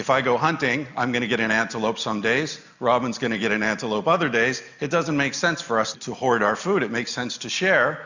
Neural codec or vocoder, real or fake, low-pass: none; real; 7.2 kHz